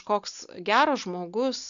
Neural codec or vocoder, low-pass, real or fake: none; 7.2 kHz; real